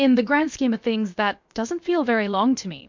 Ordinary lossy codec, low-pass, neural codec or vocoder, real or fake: MP3, 64 kbps; 7.2 kHz; codec, 16 kHz, about 1 kbps, DyCAST, with the encoder's durations; fake